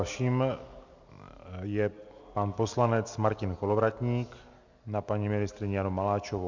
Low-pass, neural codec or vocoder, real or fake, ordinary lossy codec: 7.2 kHz; none; real; MP3, 64 kbps